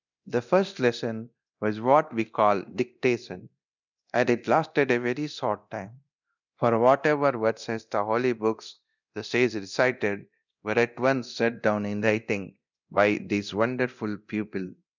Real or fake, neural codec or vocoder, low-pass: fake; codec, 24 kHz, 0.9 kbps, DualCodec; 7.2 kHz